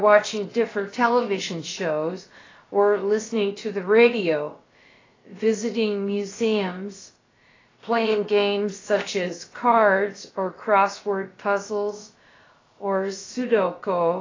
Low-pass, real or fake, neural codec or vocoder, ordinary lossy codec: 7.2 kHz; fake; codec, 16 kHz, about 1 kbps, DyCAST, with the encoder's durations; AAC, 32 kbps